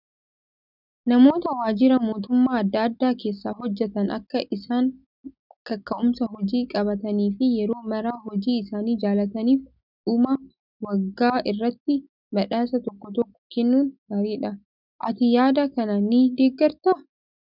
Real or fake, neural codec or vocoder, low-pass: real; none; 5.4 kHz